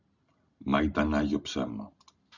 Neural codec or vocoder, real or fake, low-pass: none; real; 7.2 kHz